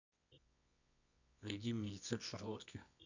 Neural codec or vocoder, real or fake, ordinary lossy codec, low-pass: codec, 24 kHz, 0.9 kbps, WavTokenizer, medium music audio release; fake; none; 7.2 kHz